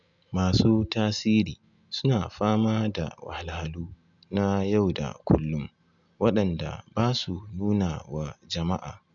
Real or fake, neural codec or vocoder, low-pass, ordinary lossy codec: real; none; 7.2 kHz; none